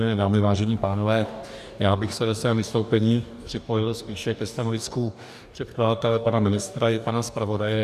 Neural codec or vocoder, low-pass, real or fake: codec, 44.1 kHz, 2.6 kbps, DAC; 14.4 kHz; fake